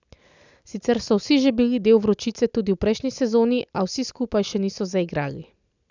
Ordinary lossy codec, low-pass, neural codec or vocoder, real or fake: none; 7.2 kHz; none; real